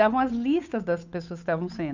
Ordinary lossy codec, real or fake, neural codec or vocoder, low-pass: none; fake; codec, 16 kHz, 8 kbps, FunCodec, trained on Chinese and English, 25 frames a second; 7.2 kHz